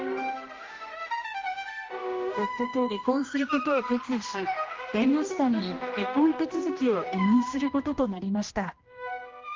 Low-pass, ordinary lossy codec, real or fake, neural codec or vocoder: 7.2 kHz; Opus, 32 kbps; fake; codec, 16 kHz, 1 kbps, X-Codec, HuBERT features, trained on general audio